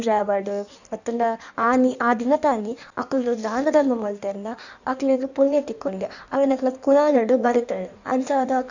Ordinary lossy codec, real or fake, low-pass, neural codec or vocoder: none; fake; 7.2 kHz; codec, 16 kHz in and 24 kHz out, 1.1 kbps, FireRedTTS-2 codec